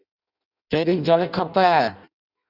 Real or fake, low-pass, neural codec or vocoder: fake; 5.4 kHz; codec, 16 kHz in and 24 kHz out, 0.6 kbps, FireRedTTS-2 codec